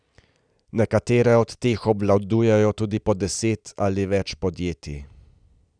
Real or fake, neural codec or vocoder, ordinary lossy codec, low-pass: real; none; none; 9.9 kHz